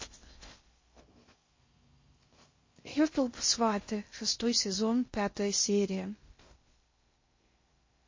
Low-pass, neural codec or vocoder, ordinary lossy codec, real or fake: 7.2 kHz; codec, 16 kHz in and 24 kHz out, 0.6 kbps, FocalCodec, streaming, 2048 codes; MP3, 32 kbps; fake